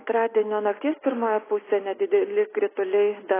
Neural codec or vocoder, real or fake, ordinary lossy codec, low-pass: none; real; AAC, 16 kbps; 3.6 kHz